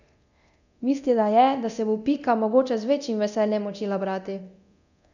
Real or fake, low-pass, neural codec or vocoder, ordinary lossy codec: fake; 7.2 kHz; codec, 24 kHz, 0.9 kbps, DualCodec; none